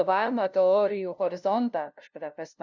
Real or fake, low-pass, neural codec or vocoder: fake; 7.2 kHz; codec, 16 kHz, 0.5 kbps, FunCodec, trained on LibriTTS, 25 frames a second